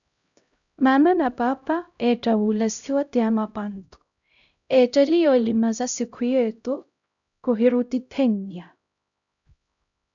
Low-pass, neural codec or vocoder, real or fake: 7.2 kHz; codec, 16 kHz, 0.5 kbps, X-Codec, HuBERT features, trained on LibriSpeech; fake